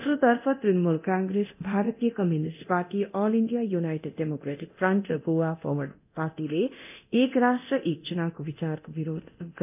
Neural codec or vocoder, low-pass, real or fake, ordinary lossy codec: codec, 24 kHz, 0.9 kbps, DualCodec; 3.6 kHz; fake; none